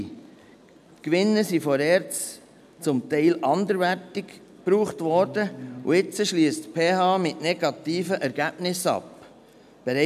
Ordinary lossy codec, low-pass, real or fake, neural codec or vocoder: none; 14.4 kHz; real; none